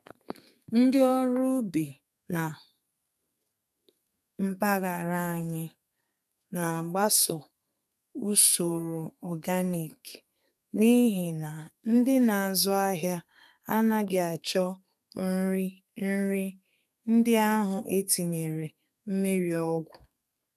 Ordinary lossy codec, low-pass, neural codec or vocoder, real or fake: none; 14.4 kHz; codec, 32 kHz, 1.9 kbps, SNAC; fake